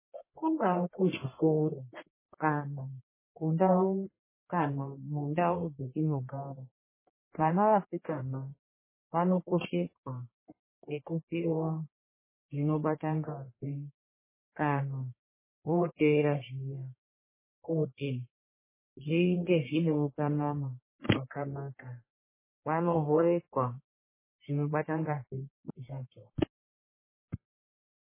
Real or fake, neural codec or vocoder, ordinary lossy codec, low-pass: fake; codec, 44.1 kHz, 1.7 kbps, Pupu-Codec; MP3, 16 kbps; 3.6 kHz